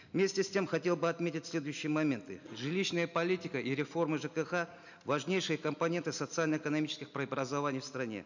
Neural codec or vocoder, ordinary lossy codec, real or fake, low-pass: none; none; real; 7.2 kHz